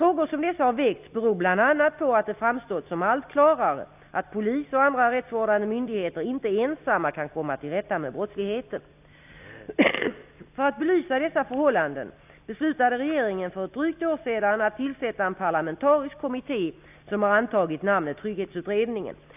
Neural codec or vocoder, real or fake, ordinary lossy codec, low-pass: none; real; none; 3.6 kHz